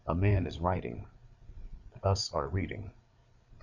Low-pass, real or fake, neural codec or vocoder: 7.2 kHz; fake; codec, 16 kHz, 8 kbps, FreqCodec, larger model